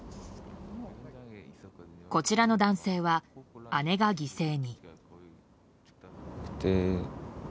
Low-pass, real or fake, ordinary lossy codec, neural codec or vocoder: none; real; none; none